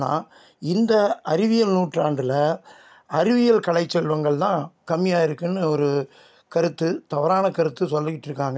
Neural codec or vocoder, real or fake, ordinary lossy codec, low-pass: none; real; none; none